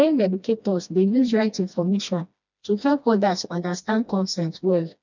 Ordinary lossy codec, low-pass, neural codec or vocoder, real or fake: none; 7.2 kHz; codec, 16 kHz, 1 kbps, FreqCodec, smaller model; fake